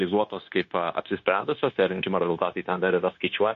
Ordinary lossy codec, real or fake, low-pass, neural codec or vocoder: MP3, 48 kbps; fake; 7.2 kHz; codec, 16 kHz, 0.9 kbps, LongCat-Audio-Codec